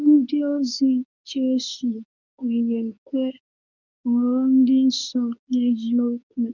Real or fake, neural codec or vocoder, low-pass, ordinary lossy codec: fake; codec, 24 kHz, 0.9 kbps, WavTokenizer, medium speech release version 2; 7.2 kHz; none